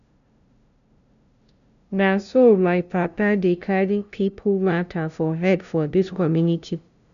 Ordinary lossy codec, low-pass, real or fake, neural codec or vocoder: none; 7.2 kHz; fake; codec, 16 kHz, 0.5 kbps, FunCodec, trained on LibriTTS, 25 frames a second